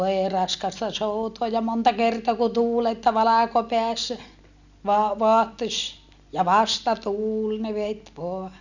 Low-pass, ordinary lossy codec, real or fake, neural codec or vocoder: 7.2 kHz; none; real; none